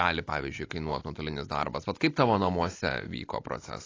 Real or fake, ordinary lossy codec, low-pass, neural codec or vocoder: real; AAC, 32 kbps; 7.2 kHz; none